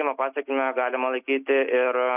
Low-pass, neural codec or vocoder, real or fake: 3.6 kHz; none; real